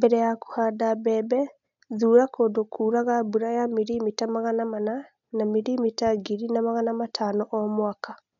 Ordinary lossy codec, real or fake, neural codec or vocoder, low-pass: none; real; none; 7.2 kHz